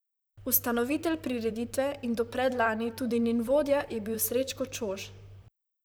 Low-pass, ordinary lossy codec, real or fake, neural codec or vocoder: none; none; fake; vocoder, 44.1 kHz, 128 mel bands, Pupu-Vocoder